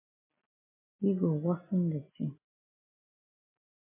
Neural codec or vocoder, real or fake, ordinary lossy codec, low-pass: none; real; MP3, 32 kbps; 3.6 kHz